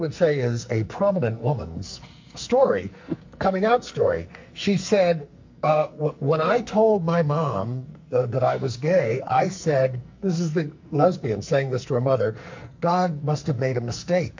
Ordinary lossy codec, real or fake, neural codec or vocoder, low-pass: MP3, 48 kbps; fake; codec, 44.1 kHz, 2.6 kbps, SNAC; 7.2 kHz